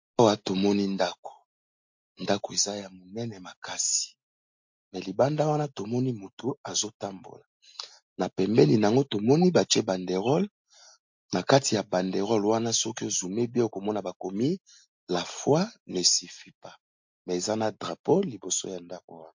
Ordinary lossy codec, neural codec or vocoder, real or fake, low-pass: MP3, 48 kbps; none; real; 7.2 kHz